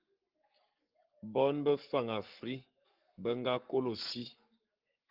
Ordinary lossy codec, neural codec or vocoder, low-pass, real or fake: Opus, 24 kbps; codec, 44.1 kHz, 7.8 kbps, Pupu-Codec; 5.4 kHz; fake